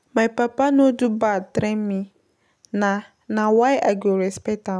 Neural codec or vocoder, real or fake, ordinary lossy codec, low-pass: none; real; none; none